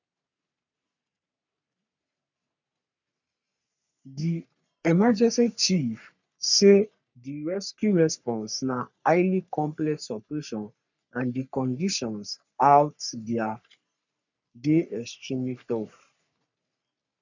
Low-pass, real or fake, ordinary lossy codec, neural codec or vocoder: 7.2 kHz; fake; none; codec, 44.1 kHz, 3.4 kbps, Pupu-Codec